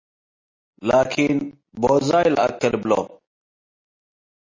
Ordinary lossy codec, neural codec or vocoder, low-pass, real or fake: MP3, 32 kbps; none; 7.2 kHz; real